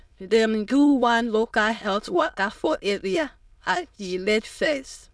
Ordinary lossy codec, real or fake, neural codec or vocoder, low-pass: none; fake; autoencoder, 22.05 kHz, a latent of 192 numbers a frame, VITS, trained on many speakers; none